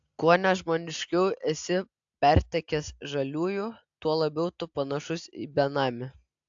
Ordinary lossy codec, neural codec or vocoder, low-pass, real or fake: AAC, 64 kbps; none; 7.2 kHz; real